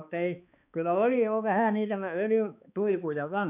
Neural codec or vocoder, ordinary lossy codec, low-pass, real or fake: codec, 16 kHz, 2 kbps, X-Codec, HuBERT features, trained on balanced general audio; none; 3.6 kHz; fake